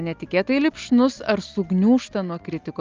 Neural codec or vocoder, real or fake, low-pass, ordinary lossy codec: none; real; 7.2 kHz; Opus, 24 kbps